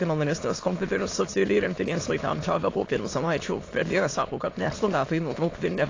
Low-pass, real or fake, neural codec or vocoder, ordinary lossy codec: 7.2 kHz; fake; autoencoder, 22.05 kHz, a latent of 192 numbers a frame, VITS, trained on many speakers; AAC, 32 kbps